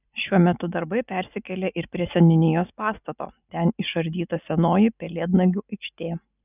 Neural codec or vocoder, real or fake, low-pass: none; real; 3.6 kHz